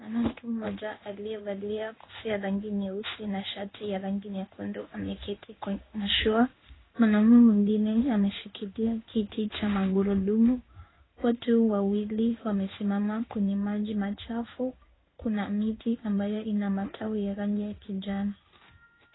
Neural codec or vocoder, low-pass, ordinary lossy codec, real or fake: codec, 16 kHz in and 24 kHz out, 1 kbps, XY-Tokenizer; 7.2 kHz; AAC, 16 kbps; fake